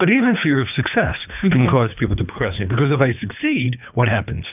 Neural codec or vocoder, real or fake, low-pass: codec, 24 kHz, 3 kbps, HILCodec; fake; 3.6 kHz